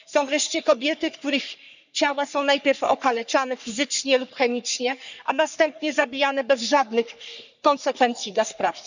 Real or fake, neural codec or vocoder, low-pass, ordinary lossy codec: fake; codec, 44.1 kHz, 3.4 kbps, Pupu-Codec; 7.2 kHz; none